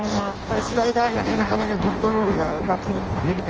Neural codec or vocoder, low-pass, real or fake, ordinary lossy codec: codec, 16 kHz in and 24 kHz out, 0.6 kbps, FireRedTTS-2 codec; 7.2 kHz; fake; Opus, 24 kbps